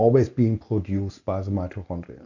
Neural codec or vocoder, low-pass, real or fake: none; 7.2 kHz; real